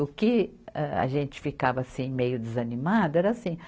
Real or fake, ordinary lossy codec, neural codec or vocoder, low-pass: real; none; none; none